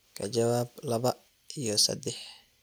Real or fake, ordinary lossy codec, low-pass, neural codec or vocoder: real; none; none; none